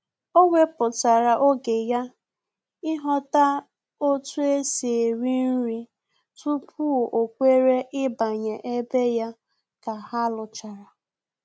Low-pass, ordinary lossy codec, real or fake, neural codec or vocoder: none; none; real; none